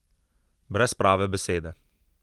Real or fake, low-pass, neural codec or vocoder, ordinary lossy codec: real; 19.8 kHz; none; Opus, 24 kbps